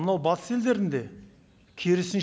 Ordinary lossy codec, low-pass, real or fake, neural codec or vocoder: none; none; real; none